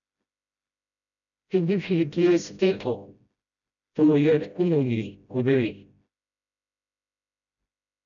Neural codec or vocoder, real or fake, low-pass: codec, 16 kHz, 0.5 kbps, FreqCodec, smaller model; fake; 7.2 kHz